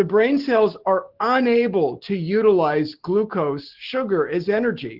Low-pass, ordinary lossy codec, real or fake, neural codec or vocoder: 5.4 kHz; Opus, 16 kbps; real; none